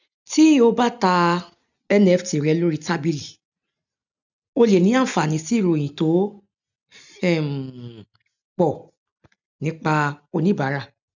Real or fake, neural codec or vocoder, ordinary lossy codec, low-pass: real; none; none; 7.2 kHz